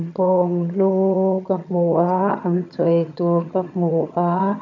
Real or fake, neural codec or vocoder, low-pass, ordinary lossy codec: fake; vocoder, 22.05 kHz, 80 mel bands, HiFi-GAN; 7.2 kHz; none